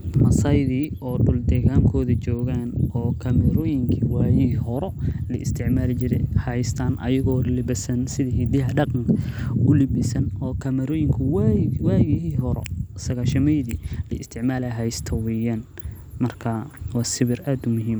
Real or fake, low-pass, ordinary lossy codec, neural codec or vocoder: real; none; none; none